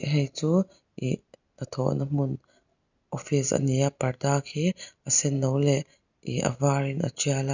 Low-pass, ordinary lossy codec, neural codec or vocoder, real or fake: 7.2 kHz; none; none; real